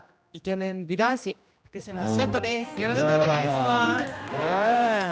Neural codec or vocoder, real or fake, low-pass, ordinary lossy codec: codec, 16 kHz, 1 kbps, X-Codec, HuBERT features, trained on general audio; fake; none; none